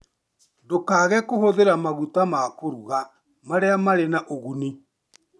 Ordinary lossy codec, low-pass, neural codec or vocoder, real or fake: none; none; none; real